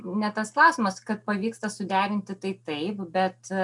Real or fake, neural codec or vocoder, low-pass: real; none; 10.8 kHz